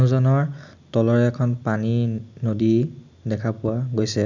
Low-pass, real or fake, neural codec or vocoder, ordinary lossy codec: 7.2 kHz; real; none; none